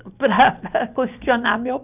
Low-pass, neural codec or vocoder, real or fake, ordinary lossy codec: 3.6 kHz; none; real; none